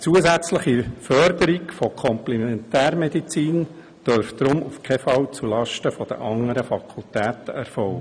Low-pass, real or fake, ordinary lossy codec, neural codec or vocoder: 9.9 kHz; real; none; none